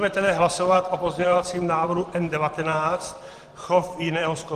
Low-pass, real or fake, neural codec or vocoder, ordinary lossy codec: 14.4 kHz; fake; vocoder, 44.1 kHz, 128 mel bands every 512 samples, BigVGAN v2; Opus, 24 kbps